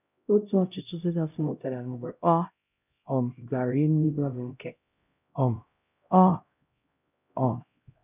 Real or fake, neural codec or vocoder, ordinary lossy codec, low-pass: fake; codec, 16 kHz, 0.5 kbps, X-Codec, HuBERT features, trained on LibriSpeech; none; 3.6 kHz